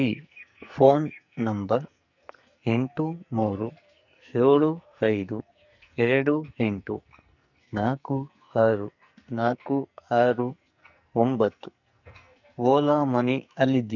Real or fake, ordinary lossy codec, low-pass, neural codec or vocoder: fake; none; 7.2 kHz; codec, 44.1 kHz, 2.6 kbps, SNAC